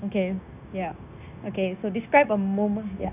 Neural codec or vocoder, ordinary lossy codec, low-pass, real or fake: codec, 16 kHz, 0.9 kbps, LongCat-Audio-Codec; none; 3.6 kHz; fake